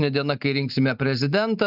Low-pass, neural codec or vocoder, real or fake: 5.4 kHz; none; real